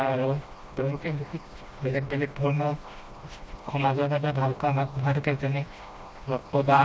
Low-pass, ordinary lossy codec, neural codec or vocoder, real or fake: none; none; codec, 16 kHz, 1 kbps, FreqCodec, smaller model; fake